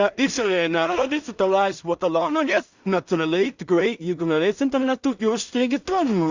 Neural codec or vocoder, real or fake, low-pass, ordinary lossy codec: codec, 16 kHz in and 24 kHz out, 0.4 kbps, LongCat-Audio-Codec, two codebook decoder; fake; 7.2 kHz; Opus, 64 kbps